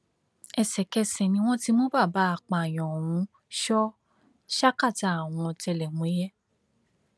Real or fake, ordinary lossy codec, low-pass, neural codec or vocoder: real; none; none; none